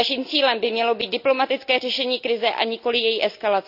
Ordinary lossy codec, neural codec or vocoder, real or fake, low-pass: none; none; real; 5.4 kHz